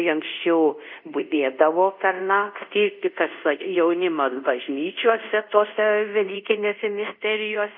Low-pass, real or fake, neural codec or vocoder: 5.4 kHz; fake; codec, 24 kHz, 0.5 kbps, DualCodec